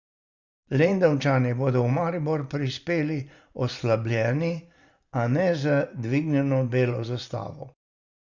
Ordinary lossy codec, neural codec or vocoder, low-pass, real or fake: Opus, 64 kbps; vocoder, 44.1 kHz, 128 mel bands every 512 samples, BigVGAN v2; 7.2 kHz; fake